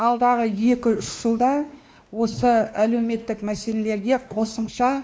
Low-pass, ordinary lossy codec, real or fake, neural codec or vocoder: none; none; fake; codec, 16 kHz, 2 kbps, X-Codec, WavLM features, trained on Multilingual LibriSpeech